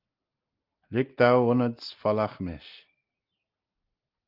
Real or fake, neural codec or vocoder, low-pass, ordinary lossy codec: real; none; 5.4 kHz; Opus, 24 kbps